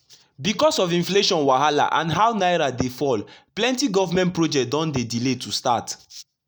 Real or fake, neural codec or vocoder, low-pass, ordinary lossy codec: real; none; none; none